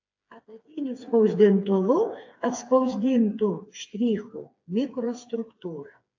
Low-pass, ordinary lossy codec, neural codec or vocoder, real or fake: 7.2 kHz; AAC, 48 kbps; codec, 16 kHz, 4 kbps, FreqCodec, smaller model; fake